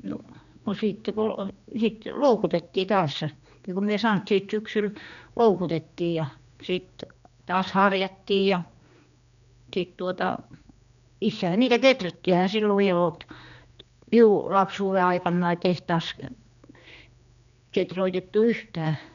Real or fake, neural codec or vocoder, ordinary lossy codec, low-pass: fake; codec, 16 kHz, 2 kbps, X-Codec, HuBERT features, trained on general audio; none; 7.2 kHz